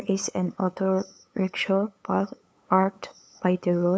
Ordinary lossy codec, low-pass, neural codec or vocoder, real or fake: none; none; codec, 16 kHz, 8 kbps, FunCodec, trained on LibriTTS, 25 frames a second; fake